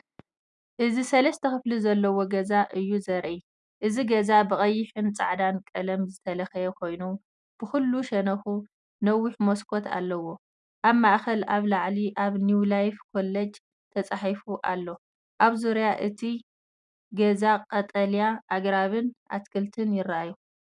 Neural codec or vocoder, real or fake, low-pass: none; real; 10.8 kHz